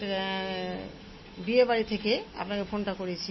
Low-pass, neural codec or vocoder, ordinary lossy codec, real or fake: 7.2 kHz; none; MP3, 24 kbps; real